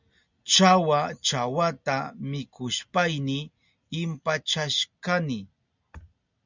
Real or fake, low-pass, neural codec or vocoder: real; 7.2 kHz; none